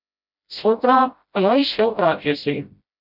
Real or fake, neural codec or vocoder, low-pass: fake; codec, 16 kHz, 0.5 kbps, FreqCodec, smaller model; 5.4 kHz